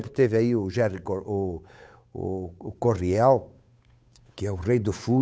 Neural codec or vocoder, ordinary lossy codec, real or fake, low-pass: codec, 16 kHz, 8 kbps, FunCodec, trained on Chinese and English, 25 frames a second; none; fake; none